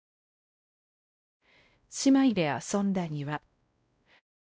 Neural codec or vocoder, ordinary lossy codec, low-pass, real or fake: codec, 16 kHz, 0.5 kbps, X-Codec, WavLM features, trained on Multilingual LibriSpeech; none; none; fake